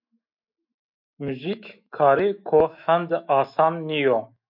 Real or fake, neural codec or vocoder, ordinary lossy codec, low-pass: fake; autoencoder, 48 kHz, 128 numbers a frame, DAC-VAE, trained on Japanese speech; MP3, 48 kbps; 5.4 kHz